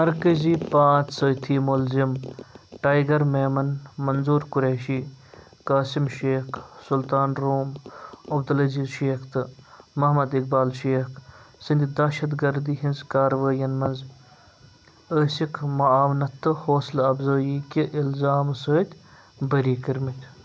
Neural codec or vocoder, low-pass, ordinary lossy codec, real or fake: none; none; none; real